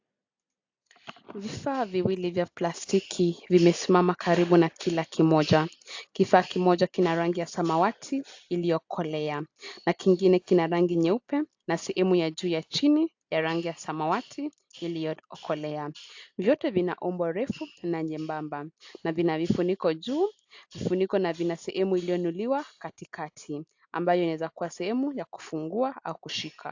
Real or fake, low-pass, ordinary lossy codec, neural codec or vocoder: real; 7.2 kHz; AAC, 48 kbps; none